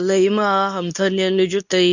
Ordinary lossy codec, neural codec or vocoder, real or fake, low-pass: none; codec, 24 kHz, 0.9 kbps, WavTokenizer, medium speech release version 2; fake; 7.2 kHz